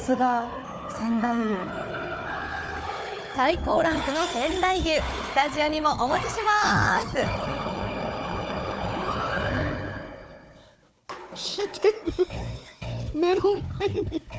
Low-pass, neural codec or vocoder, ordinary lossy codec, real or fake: none; codec, 16 kHz, 4 kbps, FunCodec, trained on Chinese and English, 50 frames a second; none; fake